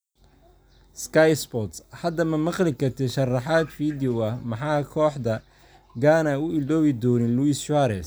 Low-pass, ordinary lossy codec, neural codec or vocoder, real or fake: none; none; none; real